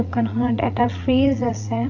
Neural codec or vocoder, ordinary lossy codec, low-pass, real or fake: vocoder, 44.1 kHz, 80 mel bands, Vocos; none; 7.2 kHz; fake